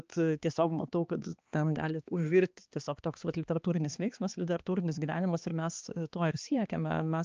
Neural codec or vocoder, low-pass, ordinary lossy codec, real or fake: codec, 16 kHz, 2 kbps, X-Codec, HuBERT features, trained on balanced general audio; 7.2 kHz; Opus, 24 kbps; fake